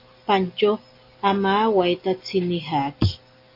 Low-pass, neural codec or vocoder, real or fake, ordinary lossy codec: 5.4 kHz; none; real; AAC, 32 kbps